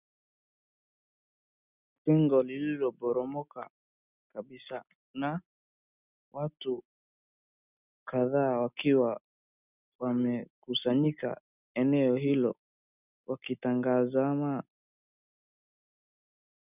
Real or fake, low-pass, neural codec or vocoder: real; 3.6 kHz; none